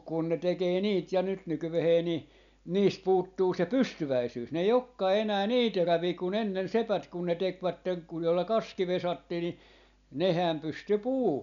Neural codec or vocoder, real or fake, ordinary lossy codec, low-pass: none; real; none; 7.2 kHz